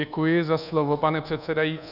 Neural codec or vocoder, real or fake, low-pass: codec, 24 kHz, 1.2 kbps, DualCodec; fake; 5.4 kHz